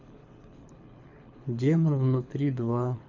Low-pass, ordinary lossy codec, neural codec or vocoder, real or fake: 7.2 kHz; none; codec, 24 kHz, 6 kbps, HILCodec; fake